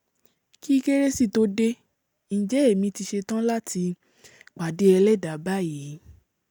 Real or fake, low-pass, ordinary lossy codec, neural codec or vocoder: real; none; none; none